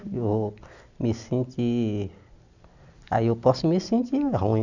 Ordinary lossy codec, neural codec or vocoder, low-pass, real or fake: none; none; 7.2 kHz; real